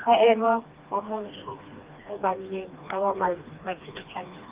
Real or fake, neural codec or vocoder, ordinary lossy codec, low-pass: fake; codec, 16 kHz, 2 kbps, FreqCodec, smaller model; Opus, 32 kbps; 3.6 kHz